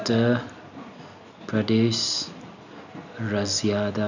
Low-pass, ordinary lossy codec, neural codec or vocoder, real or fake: 7.2 kHz; none; none; real